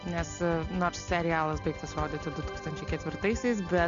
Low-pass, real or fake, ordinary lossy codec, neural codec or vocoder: 7.2 kHz; real; MP3, 64 kbps; none